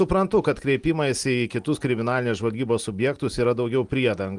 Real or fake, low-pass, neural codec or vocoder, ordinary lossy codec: real; 10.8 kHz; none; Opus, 24 kbps